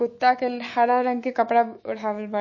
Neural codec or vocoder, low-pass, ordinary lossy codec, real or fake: autoencoder, 48 kHz, 128 numbers a frame, DAC-VAE, trained on Japanese speech; 7.2 kHz; MP3, 32 kbps; fake